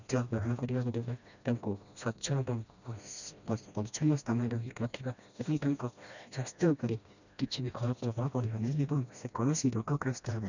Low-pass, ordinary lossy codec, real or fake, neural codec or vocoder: 7.2 kHz; none; fake; codec, 16 kHz, 1 kbps, FreqCodec, smaller model